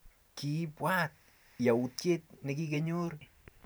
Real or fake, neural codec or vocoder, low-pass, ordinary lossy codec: fake; vocoder, 44.1 kHz, 128 mel bands every 512 samples, BigVGAN v2; none; none